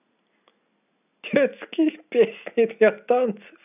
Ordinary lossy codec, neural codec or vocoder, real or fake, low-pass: none; none; real; 3.6 kHz